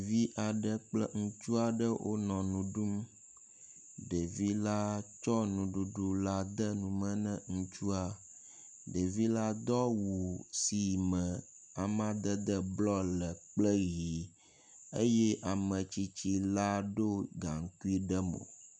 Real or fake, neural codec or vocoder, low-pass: real; none; 9.9 kHz